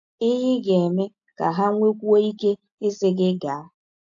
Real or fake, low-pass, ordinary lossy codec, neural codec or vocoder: real; 7.2 kHz; AAC, 64 kbps; none